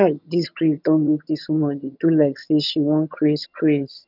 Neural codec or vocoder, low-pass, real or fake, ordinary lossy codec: vocoder, 22.05 kHz, 80 mel bands, HiFi-GAN; 5.4 kHz; fake; none